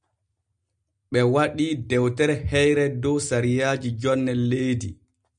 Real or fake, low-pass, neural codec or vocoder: real; 9.9 kHz; none